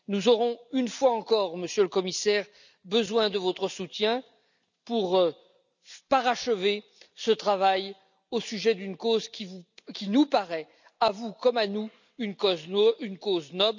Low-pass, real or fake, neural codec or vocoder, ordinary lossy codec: 7.2 kHz; real; none; none